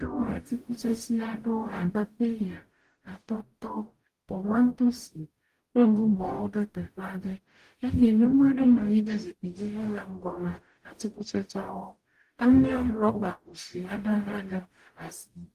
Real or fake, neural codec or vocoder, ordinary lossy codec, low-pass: fake; codec, 44.1 kHz, 0.9 kbps, DAC; Opus, 24 kbps; 14.4 kHz